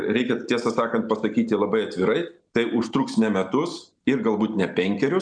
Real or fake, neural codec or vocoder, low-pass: real; none; 9.9 kHz